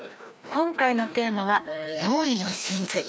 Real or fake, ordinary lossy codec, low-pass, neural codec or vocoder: fake; none; none; codec, 16 kHz, 1 kbps, FreqCodec, larger model